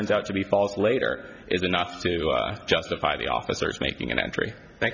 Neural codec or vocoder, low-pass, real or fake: none; 7.2 kHz; real